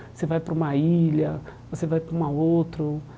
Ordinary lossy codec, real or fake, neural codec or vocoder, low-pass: none; real; none; none